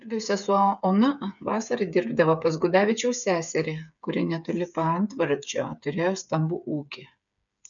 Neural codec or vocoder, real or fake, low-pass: codec, 16 kHz, 8 kbps, FreqCodec, smaller model; fake; 7.2 kHz